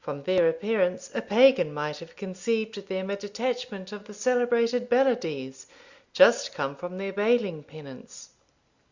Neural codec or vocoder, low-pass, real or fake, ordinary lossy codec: none; 7.2 kHz; real; Opus, 64 kbps